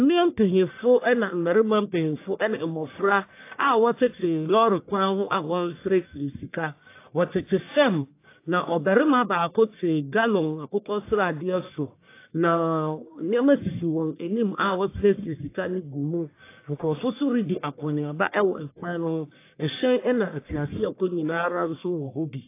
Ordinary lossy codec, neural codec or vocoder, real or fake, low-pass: AAC, 24 kbps; codec, 44.1 kHz, 1.7 kbps, Pupu-Codec; fake; 3.6 kHz